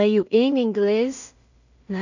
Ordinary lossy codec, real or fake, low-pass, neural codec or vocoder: none; fake; 7.2 kHz; codec, 16 kHz in and 24 kHz out, 0.4 kbps, LongCat-Audio-Codec, two codebook decoder